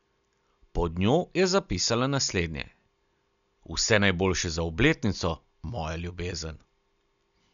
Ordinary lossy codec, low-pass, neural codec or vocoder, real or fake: none; 7.2 kHz; none; real